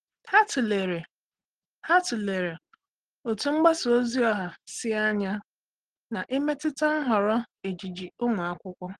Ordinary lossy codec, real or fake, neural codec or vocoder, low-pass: Opus, 16 kbps; real; none; 10.8 kHz